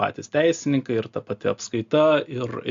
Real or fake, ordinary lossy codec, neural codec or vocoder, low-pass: real; AAC, 64 kbps; none; 7.2 kHz